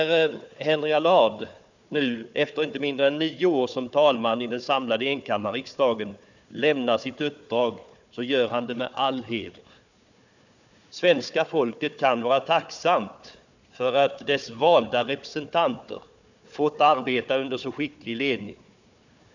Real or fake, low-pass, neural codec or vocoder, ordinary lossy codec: fake; 7.2 kHz; codec, 16 kHz, 4 kbps, FunCodec, trained on Chinese and English, 50 frames a second; none